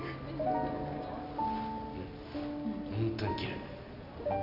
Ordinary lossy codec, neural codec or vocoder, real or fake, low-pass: none; none; real; 5.4 kHz